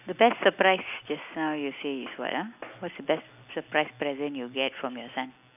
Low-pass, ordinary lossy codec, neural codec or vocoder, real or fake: 3.6 kHz; none; none; real